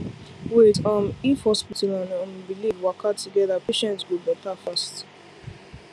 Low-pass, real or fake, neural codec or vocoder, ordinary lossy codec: none; real; none; none